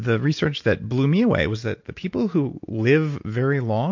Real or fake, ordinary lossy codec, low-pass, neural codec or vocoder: real; MP3, 48 kbps; 7.2 kHz; none